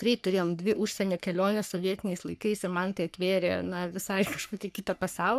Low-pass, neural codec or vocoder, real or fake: 14.4 kHz; codec, 44.1 kHz, 3.4 kbps, Pupu-Codec; fake